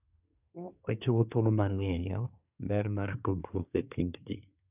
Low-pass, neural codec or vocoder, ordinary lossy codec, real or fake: 3.6 kHz; codec, 24 kHz, 1 kbps, SNAC; none; fake